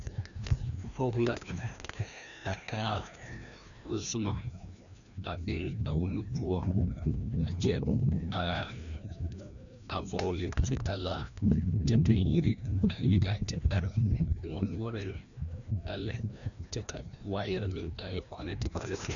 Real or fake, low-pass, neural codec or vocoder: fake; 7.2 kHz; codec, 16 kHz, 1 kbps, FreqCodec, larger model